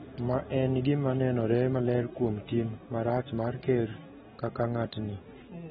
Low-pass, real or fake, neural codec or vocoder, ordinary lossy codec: 7.2 kHz; real; none; AAC, 16 kbps